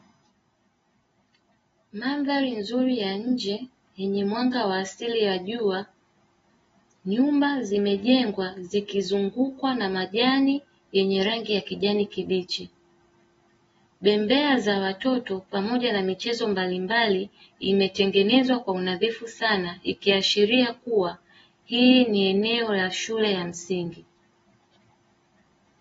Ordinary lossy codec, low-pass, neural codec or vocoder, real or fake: AAC, 24 kbps; 19.8 kHz; none; real